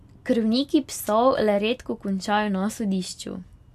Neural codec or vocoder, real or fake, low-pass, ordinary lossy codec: none; real; 14.4 kHz; AAC, 96 kbps